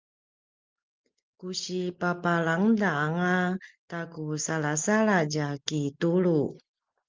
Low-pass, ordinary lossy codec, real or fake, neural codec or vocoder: 7.2 kHz; Opus, 32 kbps; real; none